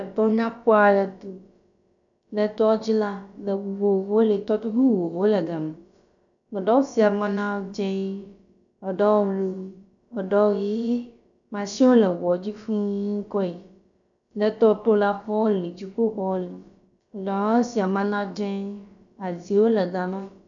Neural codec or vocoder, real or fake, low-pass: codec, 16 kHz, about 1 kbps, DyCAST, with the encoder's durations; fake; 7.2 kHz